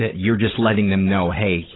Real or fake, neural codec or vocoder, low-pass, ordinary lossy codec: fake; codec, 16 kHz, 16 kbps, FunCodec, trained on Chinese and English, 50 frames a second; 7.2 kHz; AAC, 16 kbps